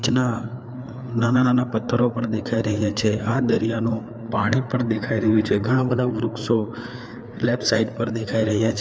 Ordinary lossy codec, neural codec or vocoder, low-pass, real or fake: none; codec, 16 kHz, 4 kbps, FreqCodec, larger model; none; fake